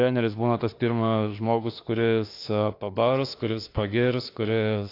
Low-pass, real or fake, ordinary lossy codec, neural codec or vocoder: 5.4 kHz; fake; AAC, 32 kbps; autoencoder, 48 kHz, 32 numbers a frame, DAC-VAE, trained on Japanese speech